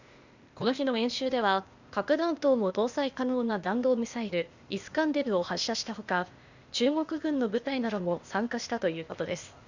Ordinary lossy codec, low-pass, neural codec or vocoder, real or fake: Opus, 64 kbps; 7.2 kHz; codec, 16 kHz, 0.8 kbps, ZipCodec; fake